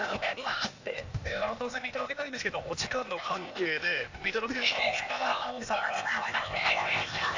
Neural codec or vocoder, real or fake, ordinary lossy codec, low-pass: codec, 16 kHz, 0.8 kbps, ZipCodec; fake; AAC, 48 kbps; 7.2 kHz